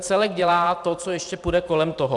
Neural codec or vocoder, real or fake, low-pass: vocoder, 44.1 kHz, 128 mel bands every 512 samples, BigVGAN v2; fake; 10.8 kHz